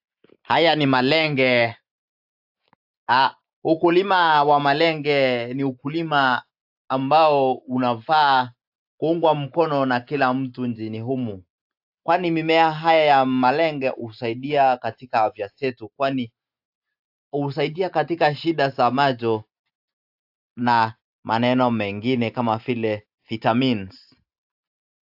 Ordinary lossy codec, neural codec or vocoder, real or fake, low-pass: AAC, 48 kbps; none; real; 5.4 kHz